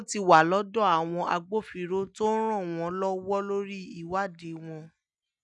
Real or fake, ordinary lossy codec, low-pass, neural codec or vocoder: real; none; 10.8 kHz; none